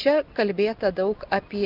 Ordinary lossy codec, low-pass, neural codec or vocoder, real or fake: Opus, 64 kbps; 5.4 kHz; none; real